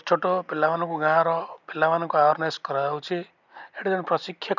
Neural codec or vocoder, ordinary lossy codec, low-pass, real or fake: none; none; 7.2 kHz; real